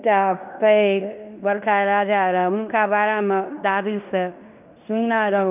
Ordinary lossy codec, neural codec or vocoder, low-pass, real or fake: none; codec, 16 kHz in and 24 kHz out, 0.9 kbps, LongCat-Audio-Codec, fine tuned four codebook decoder; 3.6 kHz; fake